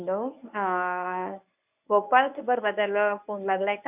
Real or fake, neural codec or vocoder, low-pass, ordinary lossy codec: fake; codec, 24 kHz, 0.9 kbps, WavTokenizer, medium speech release version 1; 3.6 kHz; AAC, 32 kbps